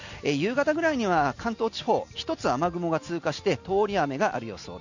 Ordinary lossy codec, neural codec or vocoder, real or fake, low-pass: none; none; real; 7.2 kHz